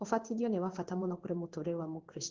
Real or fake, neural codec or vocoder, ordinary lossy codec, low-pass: fake; codec, 16 kHz in and 24 kHz out, 1 kbps, XY-Tokenizer; Opus, 16 kbps; 7.2 kHz